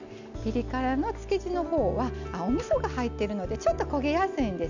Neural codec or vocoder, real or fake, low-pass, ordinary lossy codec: none; real; 7.2 kHz; none